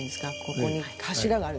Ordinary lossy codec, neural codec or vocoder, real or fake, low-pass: none; none; real; none